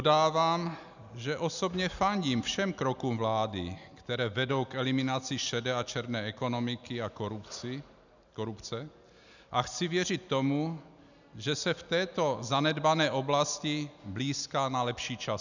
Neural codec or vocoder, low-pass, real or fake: none; 7.2 kHz; real